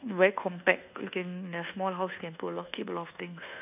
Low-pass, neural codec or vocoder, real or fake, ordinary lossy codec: 3.6 kHz; codec, 24 kHz, 1.2 kbps, DualCodec; fake; none